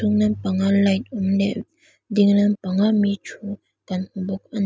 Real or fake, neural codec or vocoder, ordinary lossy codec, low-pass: real; none; none; none